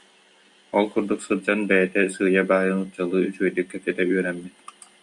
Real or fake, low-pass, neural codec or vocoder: real; 10.8 kHz; none